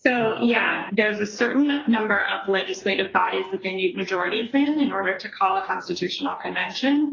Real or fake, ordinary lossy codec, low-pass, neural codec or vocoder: fake; AAC, 32 kbps; 7.2 kHz; codec, 44.1 kHz, 2.6 kbps, DAC